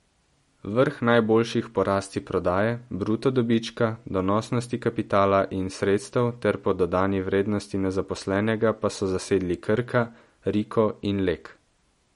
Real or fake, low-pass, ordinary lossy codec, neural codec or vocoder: real; 19.8 kHz; MP3, 48 kbps; none